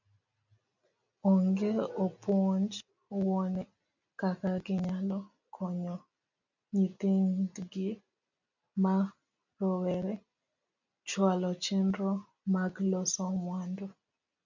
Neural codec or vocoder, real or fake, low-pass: none; real; 7.2 kHz